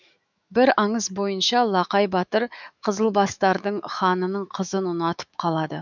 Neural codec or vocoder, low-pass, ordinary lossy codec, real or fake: autoencoder, 48 kHz, 128 numbers a frame, DAC-VAE, trained on Japanese speech; 7.2 kHz; none; fake